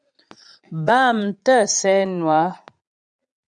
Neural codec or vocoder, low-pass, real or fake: vocoder, 22.05 kHz, 80 mel bands, Vocos; 9.9 kHz; fake